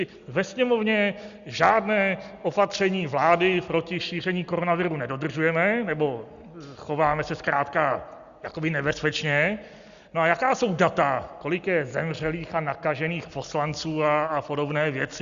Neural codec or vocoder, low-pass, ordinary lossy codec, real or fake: none; 7.2 kHz; Opus, 64 kbps; real